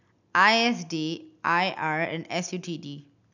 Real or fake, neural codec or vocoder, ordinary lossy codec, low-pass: real; none; none; 7.2 kHz